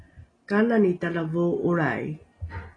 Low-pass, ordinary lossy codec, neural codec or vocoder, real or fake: 9.9 kHz; AAC, 32 kbps; none; real